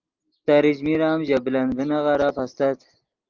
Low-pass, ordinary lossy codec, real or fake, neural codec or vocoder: 7.2 kHz; Opus, 24 kbps; real; none